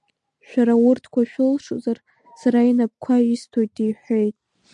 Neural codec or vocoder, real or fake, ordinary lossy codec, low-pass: none; real; MP3, 96 kbps; 10.8 kHz